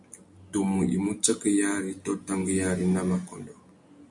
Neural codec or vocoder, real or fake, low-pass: none; real; 10.8 kHz